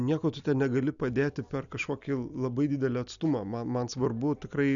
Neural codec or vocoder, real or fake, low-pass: none; real; 7.2 kHz